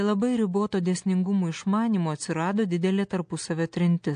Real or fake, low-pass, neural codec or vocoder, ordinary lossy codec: real; 9.9 kHz; none; AAC, 64 kbps